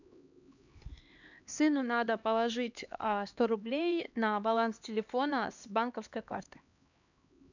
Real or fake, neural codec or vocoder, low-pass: fake; codec, 16 kHz, 2 kbps, X-Codec, HuBERT features, trained on LibriSpeech; 7.2 kHz